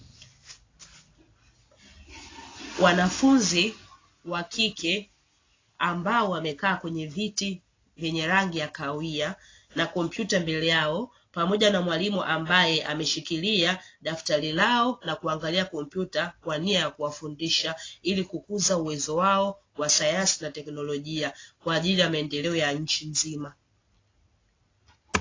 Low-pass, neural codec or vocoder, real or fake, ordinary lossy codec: 7.2 kHz; none; real; AAC, 32 kbps